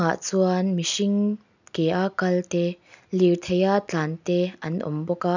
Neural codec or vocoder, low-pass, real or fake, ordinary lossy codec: none; 7.2 kHz; real; none